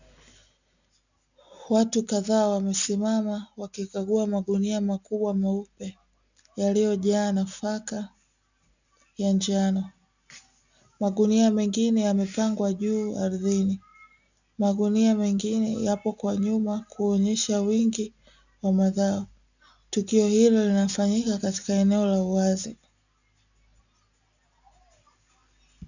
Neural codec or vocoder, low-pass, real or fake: none; 7.2 kHz; real